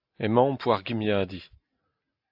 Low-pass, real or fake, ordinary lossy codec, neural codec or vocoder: 5.4 kHz; real; AAC, 48 kbps; none